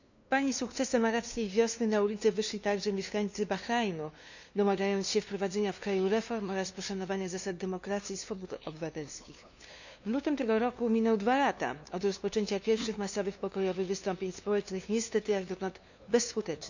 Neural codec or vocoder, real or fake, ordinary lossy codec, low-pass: codec, 16 kHz, 2 kbps, FunCodec, trained on LibriTTS, 25 frames a second; fake; none; 7.2 kHz